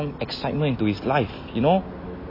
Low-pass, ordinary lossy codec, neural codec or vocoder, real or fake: 5.4 kHz; MP3, 24 kbps; none; real